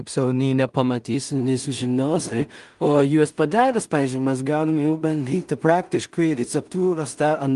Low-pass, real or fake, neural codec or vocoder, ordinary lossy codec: 10.8 kHz; fake; codec, 16 kHz in and 24 kHz out, 0.4 kbps, LongCat-Audio-Codec, two codebook decoder; Opus, 32 kbps